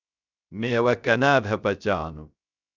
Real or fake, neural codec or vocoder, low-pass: fake; codec, 16 kHz, 0.3 kbps, FocalCodec; 7.2 kHz